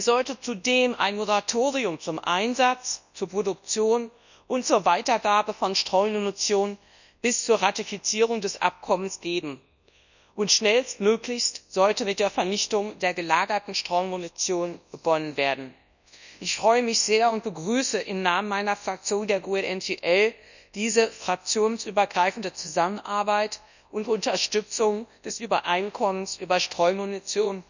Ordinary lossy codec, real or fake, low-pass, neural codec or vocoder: none; fake; 7.2 kHz; codec, 24 kHz, 0.9 kbps, WavTokenizer, large speech release